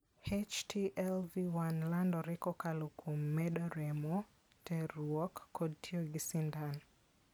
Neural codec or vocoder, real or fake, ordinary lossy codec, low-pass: none; real; none; none